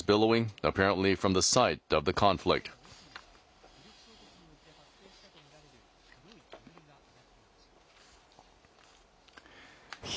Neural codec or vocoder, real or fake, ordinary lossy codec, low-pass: none; real; none; none